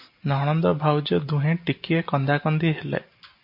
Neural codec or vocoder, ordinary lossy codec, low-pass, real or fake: none; MP3, 32 kbps; 5.4 kHz; real